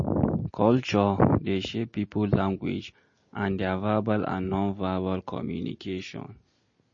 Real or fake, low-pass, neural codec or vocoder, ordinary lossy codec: real; 7.2 kHz; none; MP3, 32 kbps